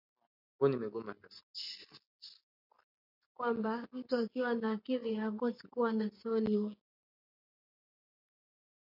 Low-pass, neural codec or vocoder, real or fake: 5.4 kHz; none; real